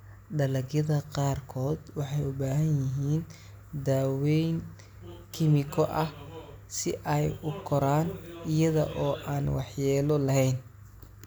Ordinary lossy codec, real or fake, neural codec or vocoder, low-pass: none; real; none; none